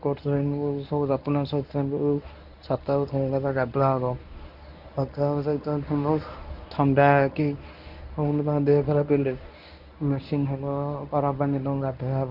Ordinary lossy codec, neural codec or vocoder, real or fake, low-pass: none; codec, 24 kHz, 0.9 kbps, WavTokenizer, medium speech release version 1; fake; 5.4 kHz